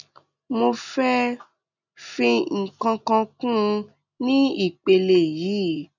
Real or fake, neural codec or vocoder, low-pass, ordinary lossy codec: real; none; 7.2 kHz; none